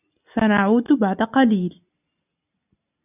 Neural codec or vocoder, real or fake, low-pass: none; real; 3.6 kHz